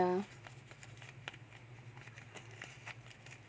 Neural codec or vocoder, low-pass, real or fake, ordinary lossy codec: none; none; real; none